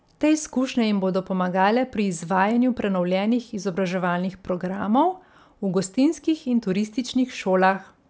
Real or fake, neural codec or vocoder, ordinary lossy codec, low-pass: real; none; none; none